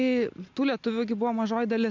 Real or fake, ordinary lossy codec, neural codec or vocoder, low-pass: real; MP3, 64 kbps; none; 7.2 kHz